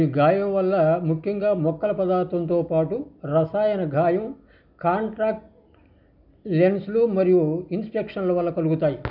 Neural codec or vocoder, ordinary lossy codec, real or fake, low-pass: none; none; real; 5.4 kHz